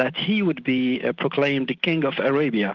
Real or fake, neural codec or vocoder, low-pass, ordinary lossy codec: real; none; 7.2 kHz; Opus, 16 kbps